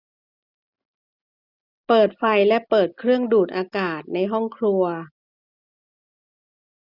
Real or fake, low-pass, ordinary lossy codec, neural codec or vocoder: real; 5.4 kHz; none; none